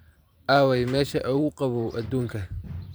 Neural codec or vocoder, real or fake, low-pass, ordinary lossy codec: vocoder, 44.1 kHz, 128 mel bands every 512 samples, BigVGAN v2; fake; none; none